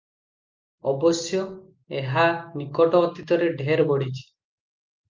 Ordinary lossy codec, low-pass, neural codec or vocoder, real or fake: Opus, 32 kbps; 7.2 kHz; none; real